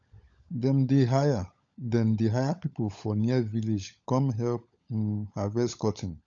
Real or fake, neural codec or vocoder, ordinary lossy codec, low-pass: fake; codec, 16 kHz, 16 kbps, FunCodec, trained on LibriTTS, 50 frames a second; AAC, 64 kbps; 7.2 kHz